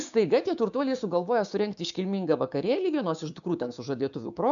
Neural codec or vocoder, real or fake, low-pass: codec, 16 kHz, 6 kbps, DAC; fake; 7.2 kHz